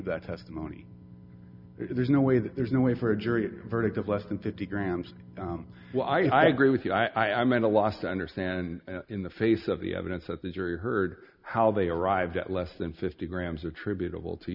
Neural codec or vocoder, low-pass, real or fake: none; 5.4 kHz; real